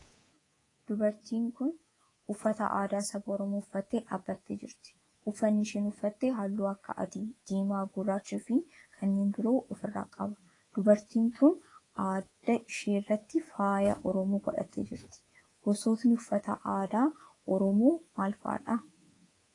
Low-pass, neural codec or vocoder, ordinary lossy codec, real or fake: 10.8 kHz; codec, 24 kHz, 3.1 kbps, DualCodec; AAC, 32 kbps; fake